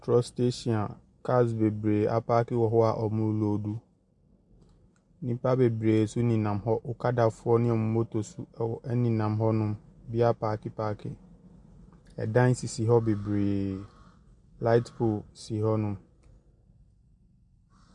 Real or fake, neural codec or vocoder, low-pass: real; none; 10.8 kHz